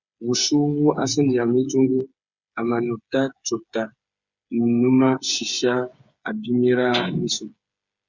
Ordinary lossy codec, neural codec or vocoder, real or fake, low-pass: Opus, 64 kbps; codec, 16 kHz, 8 kbps, FreqCodec, smaller model; fake; 7.2 kHz